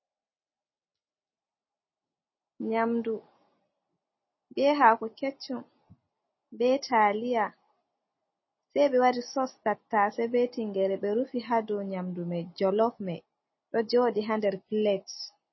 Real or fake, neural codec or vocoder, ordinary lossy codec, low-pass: real; none; MP3, 24 kbps; 7.2 kHz